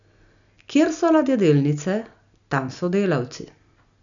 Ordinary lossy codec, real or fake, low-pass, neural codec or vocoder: none; real; 7.2 kHz; none